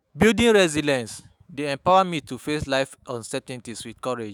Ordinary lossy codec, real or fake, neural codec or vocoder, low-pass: none; fake; autoencoder, 48 kHz, 128 numbers a frame, DAC-VAE, trained on Japanese speech; none